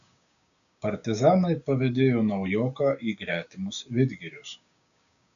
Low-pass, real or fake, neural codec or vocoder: 7.2 kHz; fake; codec, 16 kHz, 6 kbps, DAC